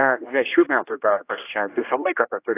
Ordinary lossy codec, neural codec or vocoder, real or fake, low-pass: AAC, 24 kbps; codec, 16 kHz, 1 kbps, X-Codec, HuBERT features, trained on general audio; fake; 3.6 kHz